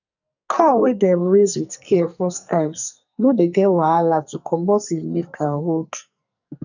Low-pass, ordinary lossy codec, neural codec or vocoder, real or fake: 7.2 kHz; none; codec, 44.1 kHz, 2.6 kbps, SNAC; fake